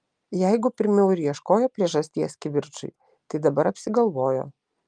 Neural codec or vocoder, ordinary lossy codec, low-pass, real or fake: none; Opus, 32 kbps; 9.9 kHz; real